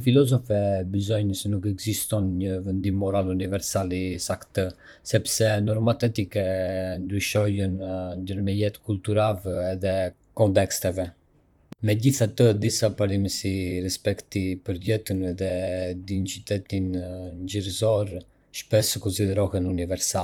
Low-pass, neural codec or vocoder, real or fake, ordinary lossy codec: 19.8 kHz; vocoder, 44.1 kHz, 128 mel bands, Pupu-Vocoder; fake; none